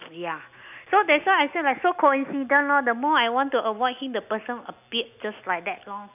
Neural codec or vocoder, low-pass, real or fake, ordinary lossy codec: none; 3.6 kHz; real; none